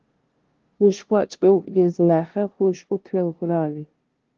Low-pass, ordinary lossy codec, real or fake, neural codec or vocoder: 7.2 kHz; Opus, 16 kbps; fake; codec, 16 kHz, 0.5 kbps, FunCodec, trained on LibriTTS, 25 frames a second